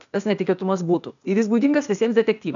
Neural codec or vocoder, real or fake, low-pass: codec, 16 kHz, 0.8 kbps, ZipCodec; fake; 7.2 kHz